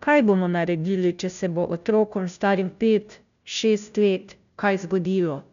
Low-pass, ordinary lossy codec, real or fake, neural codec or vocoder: 7.2 kHz; none; fake; codec, 16 kHz, 0.5 kbps, FunCodec, trained on Chinese and English, 25 frames a second